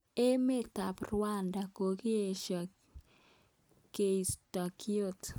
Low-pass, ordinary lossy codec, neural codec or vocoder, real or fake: none; none; none; real